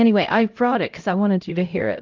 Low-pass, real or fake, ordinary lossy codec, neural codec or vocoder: 7.2 kHz; fake; Opus, 24 kbps; codec, 16 kHz, 0.5 kbps, X-Codec, HuBERT features, trained on LibriSpeech